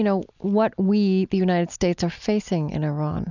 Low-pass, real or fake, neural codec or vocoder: 7.2 kHz; real; none